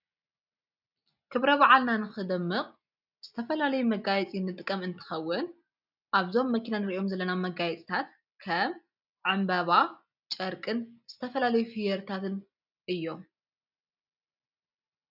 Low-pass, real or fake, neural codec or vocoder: 5.4 kHz; real; none